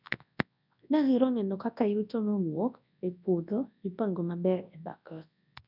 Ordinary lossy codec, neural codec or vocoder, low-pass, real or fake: none; codec, 24 kHz, 0.9 kbps, WavTokenizer, large speech release; 5.4 kHz; fake